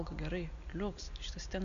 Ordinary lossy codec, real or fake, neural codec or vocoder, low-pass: MP3, 96 kbps; real; none; 7.2 kHz